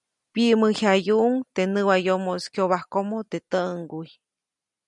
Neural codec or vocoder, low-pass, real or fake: none; 10.8 kHz; real